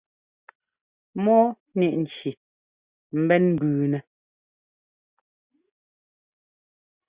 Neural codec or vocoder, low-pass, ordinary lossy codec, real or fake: none; 3.6 kHz; Opus, 64 kbps; real